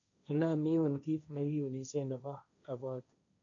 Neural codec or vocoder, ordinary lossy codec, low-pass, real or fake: codec, 16 kHz, 1.1 kbps, Voila-Tokenizer; AAC, 48 kbps; 7.2 kHz; fake